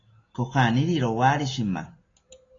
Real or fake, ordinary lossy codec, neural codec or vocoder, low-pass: real; AAC, 32 kbps; none; 7.2 kHz